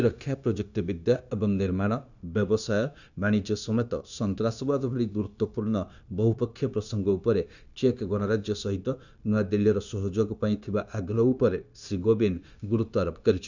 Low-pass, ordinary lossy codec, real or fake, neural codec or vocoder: 7.2 kHz; none; fake; codec, 16 kHz, 0.9 kbps, LongCat-Audio-Codec